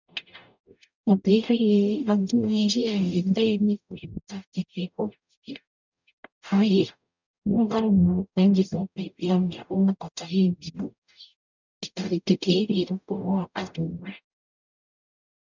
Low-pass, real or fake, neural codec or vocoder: 7.2 kHz; fake; codec, 44.1 kHz, 0.9 kbps, DAC